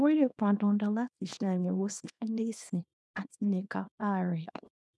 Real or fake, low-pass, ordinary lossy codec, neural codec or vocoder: fake; none; none; codec, 24 kHz, 0.9 kbps, WavTokenizer, small release